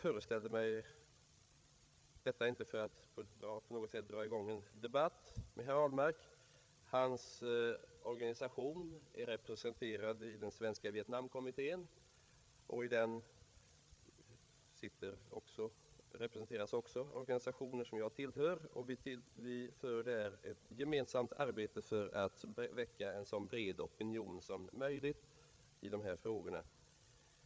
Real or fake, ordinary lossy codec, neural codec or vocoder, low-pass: fake; none; codec, 16 kHz, 8 kbps, FreqCodec, larger model; none